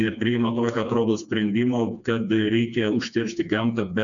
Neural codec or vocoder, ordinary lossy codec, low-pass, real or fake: codec, 16 kHz, 2 kbps, FreqCodec, smaller model; MP3, 96 kbps; 7.2 kHz; fake